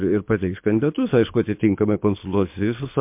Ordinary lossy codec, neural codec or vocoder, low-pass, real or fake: MP3, 32 kbps; codec, 24 kHz, 6 kbps, HILCodec; 3.6 kHz; fake